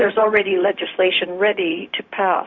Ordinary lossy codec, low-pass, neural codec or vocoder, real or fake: MP3, 48 kbps; 7.2 kHz; codec, 16 kHz, 0.4 kbps, LongCat-Audio-Codec; fake